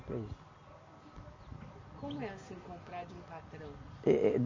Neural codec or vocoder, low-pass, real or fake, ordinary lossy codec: none; 7.2 kHz; real; MP3, 32 kbps